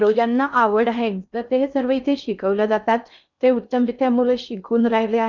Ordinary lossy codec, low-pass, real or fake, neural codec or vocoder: none; 7.2 kHz; fake; codec, 16 kHz in and 24 kHz out, 0.6 kbps, FocalCodec, streaming, 2048 codes